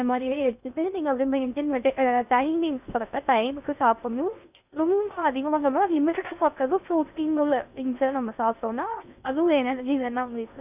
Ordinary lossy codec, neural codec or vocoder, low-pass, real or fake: none; codec, 16 kHz in and 24 kHz out, 0.6 kbps, FocalCodec, streaming, 2048 codes; 3.6 kHz; fake